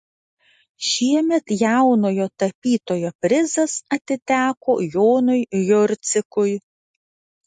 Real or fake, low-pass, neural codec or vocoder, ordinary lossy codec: real; 10.8 kHz; none; MP3, 48 kbps